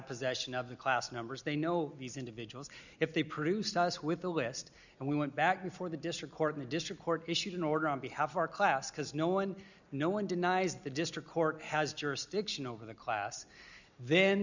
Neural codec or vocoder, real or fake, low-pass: none; real; 7.2 kHz